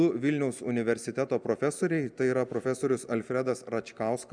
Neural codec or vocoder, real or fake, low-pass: none; real; 9.9 kHz